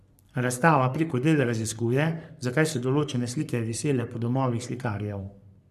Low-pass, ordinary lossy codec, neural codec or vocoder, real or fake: 14.4 kHz; none; codec, 44.1 kHz, 3.4 kbps, Pupu-Codec; fake